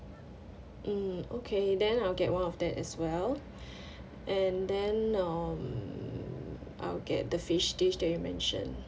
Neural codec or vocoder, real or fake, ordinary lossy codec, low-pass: none; real; none; none